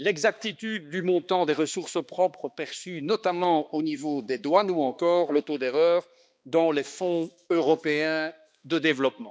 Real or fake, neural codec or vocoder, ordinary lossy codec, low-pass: fake; codec, 16 kHz, 2 kbps, X-Codec, HuBERT features, trained on balanced general audio; none; none